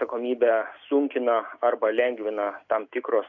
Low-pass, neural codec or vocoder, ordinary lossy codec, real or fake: 7.2 kHz; none; AAC, 48 kbps; real